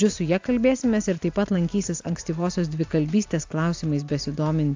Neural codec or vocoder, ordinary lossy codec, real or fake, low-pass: none; AAC, 48 kbps; real; 7.2 kHz